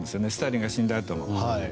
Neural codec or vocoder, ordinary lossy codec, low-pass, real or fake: none; none; none; real